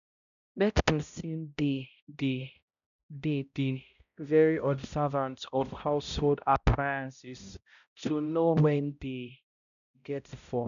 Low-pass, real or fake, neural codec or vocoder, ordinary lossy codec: 7.2 kHz; fake; codec, 16 kHz, 0.5 kbps, X-Codec, HuBERT features, trained on balanced general audio; none